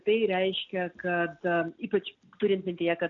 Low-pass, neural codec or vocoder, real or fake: 7.2 kHz; none; real